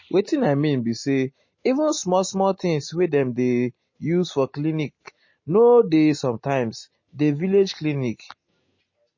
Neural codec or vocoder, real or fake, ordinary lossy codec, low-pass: none; real; MP3, 32 kbps; 7.2 kHz